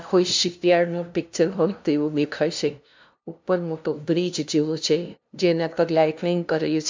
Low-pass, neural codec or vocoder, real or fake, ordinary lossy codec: 7.2 kHz; codec, 16 kHz, 0.5 kbps, FunCodec, trained on LibriTTS, 25 frames a second; fake; MP3, 64 kbps